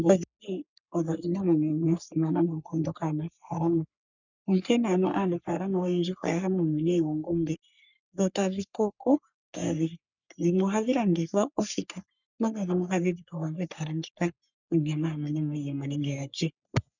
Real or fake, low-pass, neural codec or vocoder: fake; 7.2 kHz; codec, 44.1 kHz, 3.4 kbps, Pupu-Codec